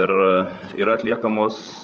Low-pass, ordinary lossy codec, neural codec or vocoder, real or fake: 7.2 kHz; Opus, 24 kbps; codec, 16 kHz, 16 kbps, FreqCodec, larger model; fake